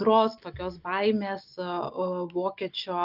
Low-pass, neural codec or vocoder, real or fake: 5.4 kHz; none; real